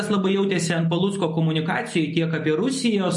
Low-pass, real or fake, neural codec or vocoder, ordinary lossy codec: 10.8 kHz; real; none; MP3, 48 kbps